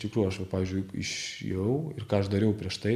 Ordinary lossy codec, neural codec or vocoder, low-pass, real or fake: AAC, 96 kbps; none; 14.4 kHz; real